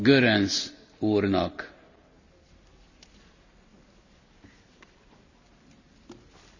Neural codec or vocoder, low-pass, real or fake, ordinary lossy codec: none; 7.2 kHz; real; none